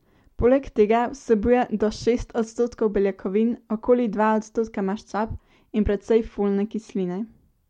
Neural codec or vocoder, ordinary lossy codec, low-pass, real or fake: none; MP3, 64 kbps; 19.8 kHz; real